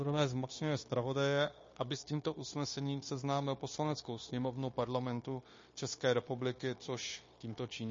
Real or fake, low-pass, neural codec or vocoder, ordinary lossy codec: fake; 7.2 kHz; codec, 16 kHz, 0.9 kbps, LongCat-Audio-Codec; MP3, 32 kbps